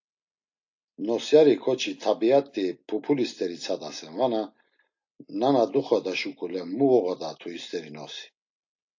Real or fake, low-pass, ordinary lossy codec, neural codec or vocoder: real; 7.2 kHz; AAC, 48 kbps; none